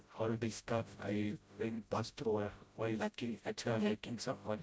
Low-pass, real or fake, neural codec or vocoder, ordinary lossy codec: none; fake; codec, 16 kHz, 0.5 kbps, FreqCodec, smaller model; none